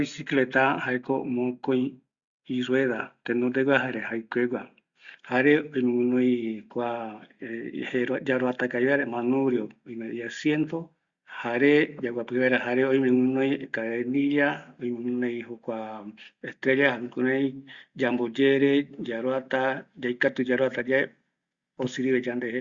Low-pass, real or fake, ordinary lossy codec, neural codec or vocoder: 7.2 kHz; real; Opus, 64 kbps; none